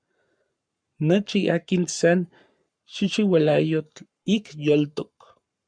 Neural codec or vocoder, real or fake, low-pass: codec, 44.1 kHz, 7.8 kbps, Pupu-Codec; fake; 9.9 kHz